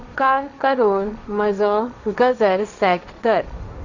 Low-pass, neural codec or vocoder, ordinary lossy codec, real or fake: 7.2 kHz; codec, 16 kHz, 1.1 kbps, Voila-Tokenizer; none; fake